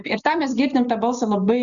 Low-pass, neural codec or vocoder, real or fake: 7.2 kHz; none; real